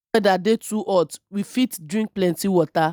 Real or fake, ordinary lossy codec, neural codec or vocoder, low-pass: real; none; none; none